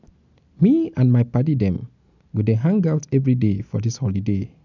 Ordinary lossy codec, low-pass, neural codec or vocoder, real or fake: none; 7.2 kHz; none; real